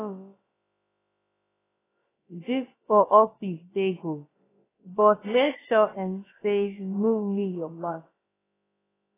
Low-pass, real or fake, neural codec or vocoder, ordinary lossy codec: 3.6 kHz; fake; codec, 16 kHz, about 1 kbps, DyCAST, with the encoder's durations; AAC, 16 kbps